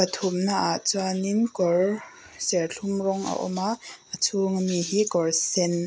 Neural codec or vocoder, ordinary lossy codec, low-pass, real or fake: none; none; none; real